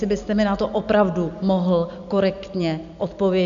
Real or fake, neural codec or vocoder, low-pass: real; none; 7.2 kHz